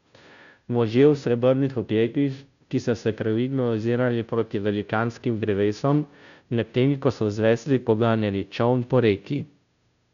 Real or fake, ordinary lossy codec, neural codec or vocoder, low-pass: fake; none; codec, 16 kHz, 0.5 kbps, FunCodec, trained on Chinese and English, 25 frames a second; 7.2 kHz